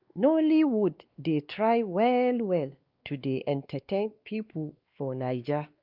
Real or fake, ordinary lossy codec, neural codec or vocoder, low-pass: fake; Opus, 24 kbps; codec, 16 kHz, 2 kbps, X-Codec, WavLM features, trained on Multilingual LibriSpeech; 5.4 kHz